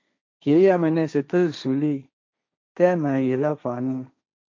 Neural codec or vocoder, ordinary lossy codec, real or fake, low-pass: codec, 16 kHz, 1.1 kbps, Voila-Tokenizer; MP3, 64 kbps; fake; 7.2 kHz